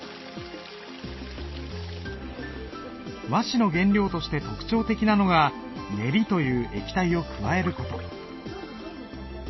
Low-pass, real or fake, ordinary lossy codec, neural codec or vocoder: 7.2 kHz; real; MP3, 24 kbps; none